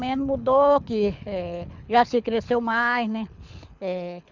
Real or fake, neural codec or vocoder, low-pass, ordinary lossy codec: fake; codec, 24 kHz, 6 kbps, HILCodec; 7.2 kHz; none